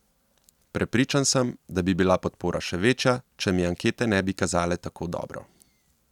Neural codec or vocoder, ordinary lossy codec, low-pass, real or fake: none; none; 19.8 kHz; real